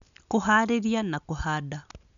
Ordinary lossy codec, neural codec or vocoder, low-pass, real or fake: none; none; 7.2 kHz; real